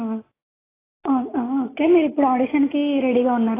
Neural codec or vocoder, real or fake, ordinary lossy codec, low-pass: none; real; AAC, 16 kbps; 3.6 kHz